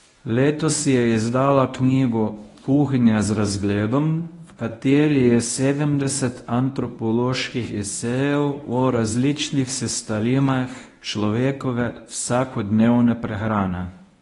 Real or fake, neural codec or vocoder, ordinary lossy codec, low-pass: fake; codec, 24 kHz, 0.9 kbps, WavTokenizer, medium speech release version 1; AAC, 32 kbps; 10.8 kHz